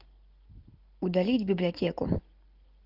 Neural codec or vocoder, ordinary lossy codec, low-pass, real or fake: none; Opus, 32 kbps; 5.4 kHz; real